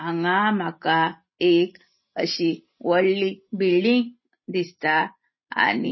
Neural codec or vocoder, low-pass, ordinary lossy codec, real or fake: none; 7.2 kHz; MP3, 24 kbps; real